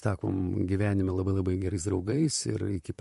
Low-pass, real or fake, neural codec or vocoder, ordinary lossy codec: 14.4 kHz; fake; vocoder, 44.1 kHz, 128 mel bands, Pupu-Vocoder; MP3, 48 kbps